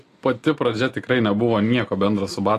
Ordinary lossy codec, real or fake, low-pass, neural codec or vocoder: AAC, 48 kbps; real; 14.4 kHz; none